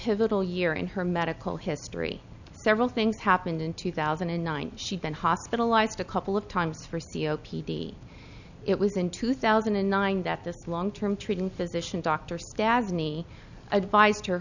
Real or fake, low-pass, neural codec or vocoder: real; 7.2 kHz; none